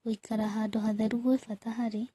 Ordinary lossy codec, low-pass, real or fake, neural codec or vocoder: AAC, 32 kbps; 19.8 kHz; real; none